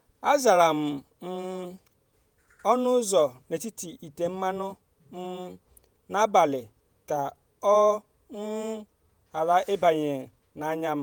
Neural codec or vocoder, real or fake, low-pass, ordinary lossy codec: vocoder, 48 kHz, 128 mel bands, Vocos; fake; none; none